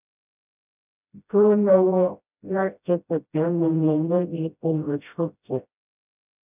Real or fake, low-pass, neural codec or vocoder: fake; 3.6 kHz; codec, 16 kHz, 0.5 kbps, FreqCodec, smaller model